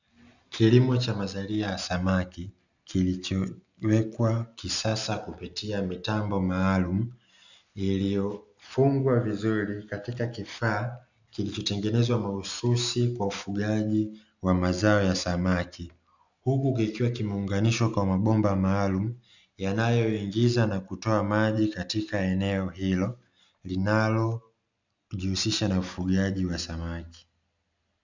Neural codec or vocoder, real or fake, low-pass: none; real; 7.2 kHz